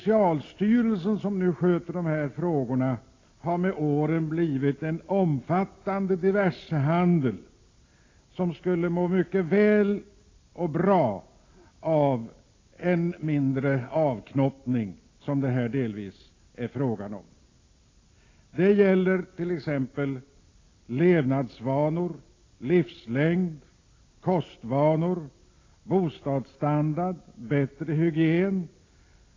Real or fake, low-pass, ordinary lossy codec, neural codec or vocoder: real; 7.2 kHz; AAC, 32 kbps; none